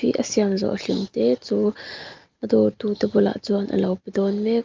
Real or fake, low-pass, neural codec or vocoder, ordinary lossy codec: real; 7.2 kHz; none; Opus, 32 kbps